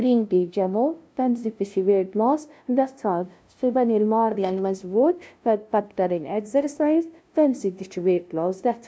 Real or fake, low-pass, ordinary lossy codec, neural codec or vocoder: fake; none; none; codec, 16 kHz, 0.5 kbps, FunCodec, trained on LibriTTS, 25 frames a second